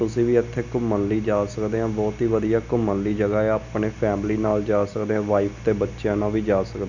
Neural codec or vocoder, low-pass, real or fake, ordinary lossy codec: none; 7.2 kHz; real; none